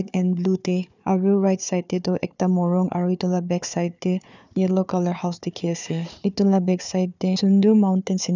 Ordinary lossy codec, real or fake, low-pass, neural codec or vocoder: none; fake; 7.2 kHz; codec, 16 kHz, 4 kbps, FunCodec, trained on Chinese and English, 50 frames a second